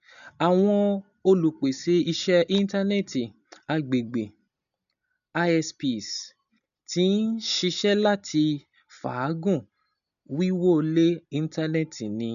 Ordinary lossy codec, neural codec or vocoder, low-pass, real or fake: none; none; 7.2 kHz; real